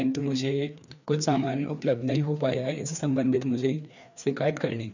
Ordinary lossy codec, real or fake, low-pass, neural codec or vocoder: none; fake; 7.2 kHz; codec, 16 kHz, 2 kbps, FreqCodec, larger model